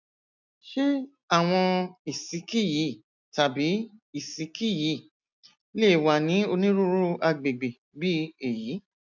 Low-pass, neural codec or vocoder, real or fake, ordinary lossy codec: 7.2 kHz; none; real; none